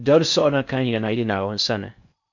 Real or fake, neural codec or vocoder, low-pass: fake; codec, 16 kHz in and 24 kHz out, 0.6 kbps, FocalCodec, streaming, 4096 codes; 7.2 kHz